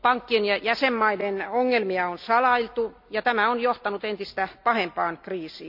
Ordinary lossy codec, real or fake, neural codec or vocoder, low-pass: none; real; none; 5.4 kHz